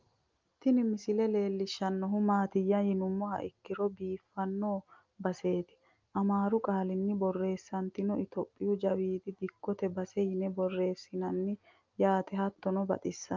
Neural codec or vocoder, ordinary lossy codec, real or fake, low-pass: none; Opus, 24 kbps; real; 7.2 kHz